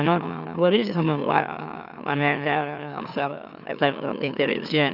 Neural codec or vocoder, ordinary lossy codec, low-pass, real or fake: autoencoder, 44.1 kHz, a latent of 192 numbers a frame, MeloTTS; none; 5.4 kHz; fake